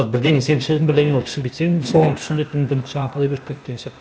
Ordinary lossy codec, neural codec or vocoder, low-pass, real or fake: none; codec, 16 kHz, 0.8 kbps, ZipCodec; none; fake